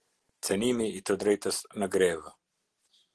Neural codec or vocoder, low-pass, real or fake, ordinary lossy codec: none; 10.8 kHz; real; Opus, 16 kbps